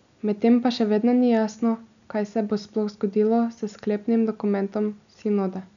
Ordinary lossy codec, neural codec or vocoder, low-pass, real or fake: none; none; 7.2 kHz; real